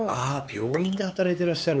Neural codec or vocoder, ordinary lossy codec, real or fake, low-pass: codec, 16 kHz, 4 kbps, X-Codec, HuBERT features, trained on LibriSpeech; none; fake; none